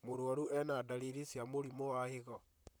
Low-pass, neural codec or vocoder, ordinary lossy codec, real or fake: none; vocoder, 44.1 kHz, 128 mel bands, Pupu-Vocoder; none; fake